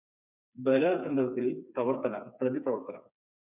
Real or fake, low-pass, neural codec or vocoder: fake; 3.6 kHz; codec, 16 kHz, 4 kbps, FreqCodec, smaller model